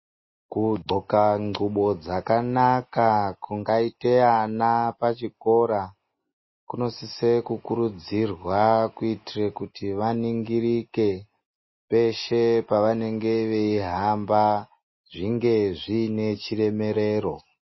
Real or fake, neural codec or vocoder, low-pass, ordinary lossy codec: real; none; 7.2 kHz; MP3, 24 kbps